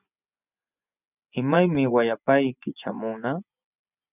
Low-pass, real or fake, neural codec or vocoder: 3.6 kHz; fake; vocoder, 24 kHz, 100 mel bands, Vocos